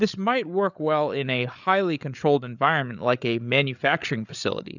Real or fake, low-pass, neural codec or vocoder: fake; 7.2 kHz; codec, 16 kHz, 8 kbps, FreqCodec, larger model